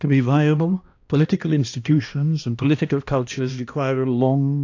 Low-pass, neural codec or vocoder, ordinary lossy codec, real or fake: 7.2 kHz; codec, 16 kHz, 1 kbps, X-Codec, HuBERT features, trained on balanced general audio; AAC, 48 kbps; fake